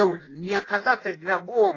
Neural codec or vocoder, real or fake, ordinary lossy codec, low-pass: codec, 16 kHz in and 24 kHz out, 0.6 kbps, FireRedTTS-2 codec; fake; AAC, 32 kbps; 7.2 kHz